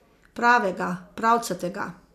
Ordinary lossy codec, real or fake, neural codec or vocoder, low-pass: none; real; none; 14.4 kHz